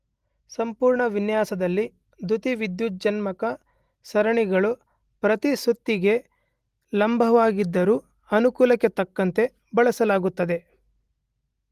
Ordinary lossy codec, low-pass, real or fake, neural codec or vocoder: Opus, 24 kbps; 14.4 kHz; real; none